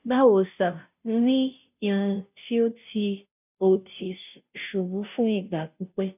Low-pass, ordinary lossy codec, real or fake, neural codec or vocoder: 3.6 kHz; none; fake; codec, 16 kHz, 0.5 kbps, FunCodec, trained on Chinese and English, 25 frames a second